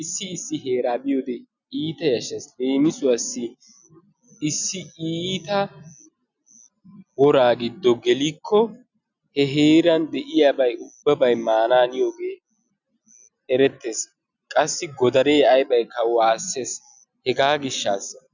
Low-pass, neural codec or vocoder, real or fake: 7.2 kHz; none; real